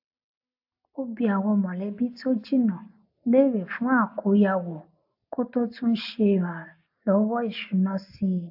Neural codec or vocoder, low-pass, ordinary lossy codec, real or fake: none; 5.4 kHz; AAC, 48 kbps; real